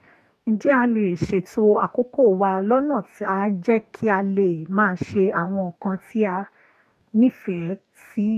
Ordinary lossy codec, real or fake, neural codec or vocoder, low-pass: MP3, 96 kbps; fake; codec, 44.1 kHz, 2.6 kbps, DAC; 14.4 kHz